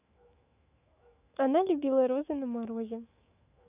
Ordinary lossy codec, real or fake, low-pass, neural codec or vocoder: none; fake; 3.6 kHz; autoencoder, 48 kHz, 128 numbers a frame, DAC-VAE, trained on Japanese speech